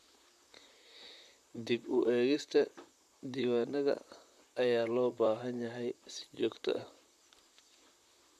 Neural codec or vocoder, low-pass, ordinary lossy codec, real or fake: vocoder, 44.1 kHz, 128 mel bands, Pupu-Vocoder; 14.4 kHz; none; fake